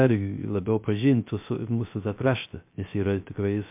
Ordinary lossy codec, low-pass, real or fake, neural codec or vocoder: MP3, 32 kbps; 3.6 kHz; fake; codec, 16 kHz, 0.3 kbps, FocalCodec